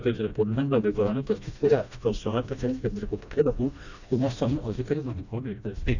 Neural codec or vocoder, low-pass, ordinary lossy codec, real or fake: codec, 16 kHz, 1 kbps, FreqCodec, smaller model; 7.2 kHz; none; fake